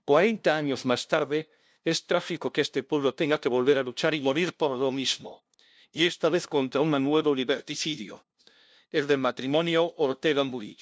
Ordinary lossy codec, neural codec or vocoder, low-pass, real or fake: none; codec, 16 kHz, 0.5 kbps, FunCodec, trained on LibriTTS, 25 frames a second; none; fake